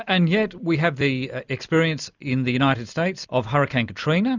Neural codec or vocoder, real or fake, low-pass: none; real; 7.2 kHz